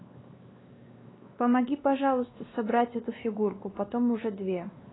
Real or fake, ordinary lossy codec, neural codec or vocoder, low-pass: fake; AAC, 16 kbps; codec, 16 kHz, 2 kbps, X-Codec, WavLM features, trained on Multilingual LibriSpeech; 7.2 kHz